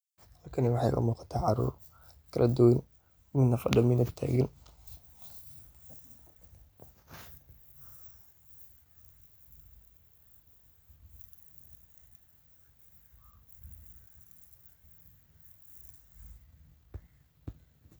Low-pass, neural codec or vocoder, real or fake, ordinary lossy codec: none; none; real; none